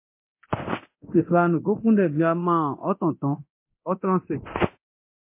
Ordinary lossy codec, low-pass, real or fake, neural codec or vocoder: MP3, 24 kbps; 3.6 kHz; fake; codec, 24 kHz, 0.9 kbps, DualCodec